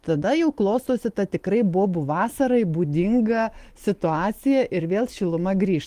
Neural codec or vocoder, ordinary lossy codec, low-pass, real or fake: none; Opus, 24 kbps; 14.4 kHz; real